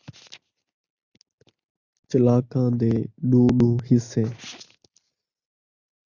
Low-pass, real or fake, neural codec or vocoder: 7.2 kHz; real; none